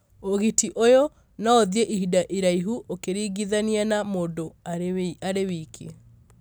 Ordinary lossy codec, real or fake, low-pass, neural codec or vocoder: none; real; none; none